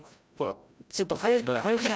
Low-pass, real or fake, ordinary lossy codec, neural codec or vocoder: none; fake; none; codec, 16 kHz, 0.5 kbps, FreqCodec, larger model